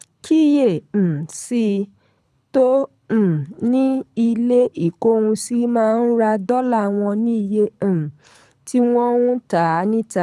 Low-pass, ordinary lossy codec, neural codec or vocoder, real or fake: none; none; codec, 24 kHz, 6 kbps, HILCodec; fake